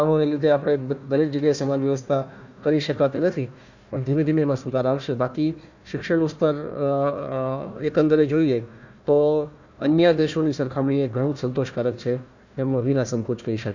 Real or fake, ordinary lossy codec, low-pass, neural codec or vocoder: fake; none; 7.2 kHz; codec, 16 kHz, 1 kbps, FunCodec, trained on Chinese and English, 50 frames a second